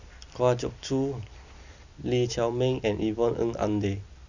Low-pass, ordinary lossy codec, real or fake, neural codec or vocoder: 7.2 kHz; none; real; none